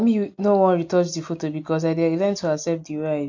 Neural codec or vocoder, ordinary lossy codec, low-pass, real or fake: none; MP3, 48 kbps; 7.2 kHz; real